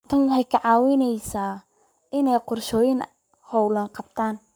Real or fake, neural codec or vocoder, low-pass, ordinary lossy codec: fake; codec, 44.1 kHz, 7.8 kbps, Pupu-Codec; none; none